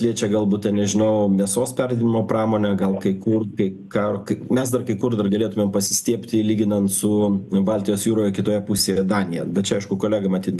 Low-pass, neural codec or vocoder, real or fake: 14.4 kHz; none; real